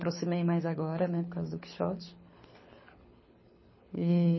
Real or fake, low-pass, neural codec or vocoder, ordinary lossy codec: fake; 7.2 kHz; codec, 24 kHz, 6 kbps, HILCodec; MP3, 24 kbps